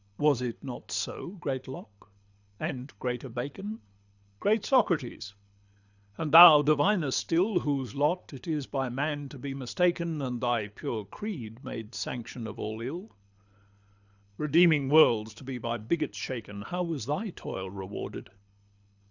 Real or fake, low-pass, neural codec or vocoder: fake; 7.2 kHz; codec, 24 kHz, 6 kbps, HILCodec